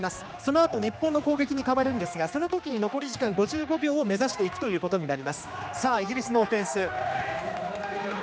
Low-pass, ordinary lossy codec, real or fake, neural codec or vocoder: none; none; fake; codec, 16 kHz, 2 kbps, X-Codec, HuBERT features, trained on general audio